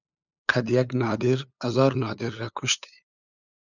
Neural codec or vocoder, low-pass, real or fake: codec, 16 kHz, 8 kbps, FunCodec, trained on LibriTTS, 25 frames a second; 7.2 kHz; fake